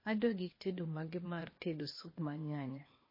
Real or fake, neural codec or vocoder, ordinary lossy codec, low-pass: fake; codec, 16 kHz, 0.8 kbps, ZipCodec; MP3, 24 kbps; 5.4 kHz